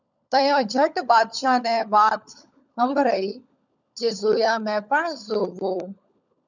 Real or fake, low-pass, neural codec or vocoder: fake; 7.2 kHz; codec, 16 kHz, 16 kbps, FunCodec, trained on LibriTTS, 50 frames a second